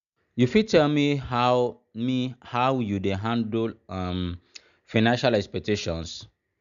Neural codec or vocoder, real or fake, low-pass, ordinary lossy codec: none; real; 7.2 kHz; none